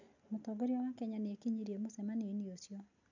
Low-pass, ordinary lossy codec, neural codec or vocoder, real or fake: 7.2 kHz; none; none; real